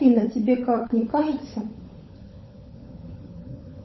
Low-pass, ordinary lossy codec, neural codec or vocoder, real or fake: 7.2 kHz; MP3, 24 kbps; codec, 16 kHz, 16 kbps, FunCodec, trained on LibriTTS, 50 frames a second; fake